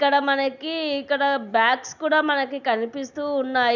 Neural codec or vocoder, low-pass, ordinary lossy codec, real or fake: none; 7.2 kHz; none; real